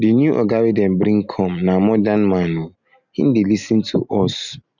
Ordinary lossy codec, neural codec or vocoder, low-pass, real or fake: none; none; 7.2 kHz; real